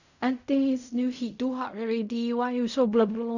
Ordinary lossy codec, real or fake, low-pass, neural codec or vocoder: none; fake; 7.2 kHz; codec, 16 kHz in and 24 kHz out, 0.4 kbps, LongCat-Audio-Codec, fine tuned four codebook decoder